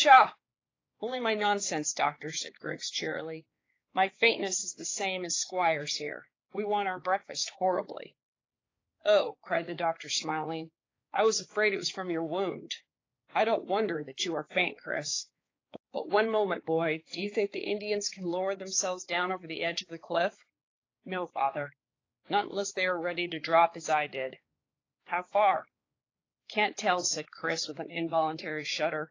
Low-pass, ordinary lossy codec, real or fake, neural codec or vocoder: 7.2 kHz; AAC, 32 kbps; fake; codec, 16 kHz, 4 kbps, X-Codec, HuBERT features, trained on balanced general audio